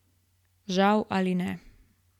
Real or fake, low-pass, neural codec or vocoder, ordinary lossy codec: real; 19.8 kHz; none; MP3, 96 kbps